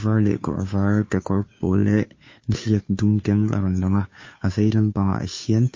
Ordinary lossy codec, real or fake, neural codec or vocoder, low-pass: MP3, 32 kbps; fake; codec, 16 kHz, 2 kbps, FunCodec, trained on Chinese and English, 25 frames a second; 7.2 kHz